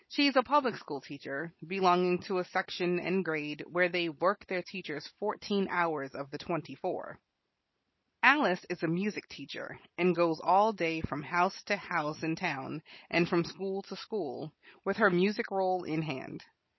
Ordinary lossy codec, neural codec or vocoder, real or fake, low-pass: MP3, 24 kbps; none; real; 7.2 kHz